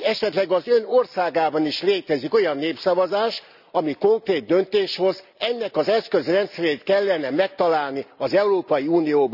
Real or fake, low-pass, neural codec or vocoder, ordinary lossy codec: real; 5.4 kHz; none; none